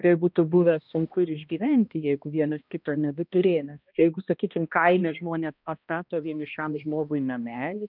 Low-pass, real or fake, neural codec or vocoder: 5.4 kHz; fake; codec, 16 kHz, 1 kbps, X-Codec, HuBERT features, trained on balanced general audio